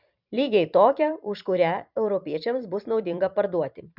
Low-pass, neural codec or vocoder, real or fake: 5.4 kHz; vocoder, 44.1 kHz, 128 mel bands every 256 samples, BigVGAN v2; fake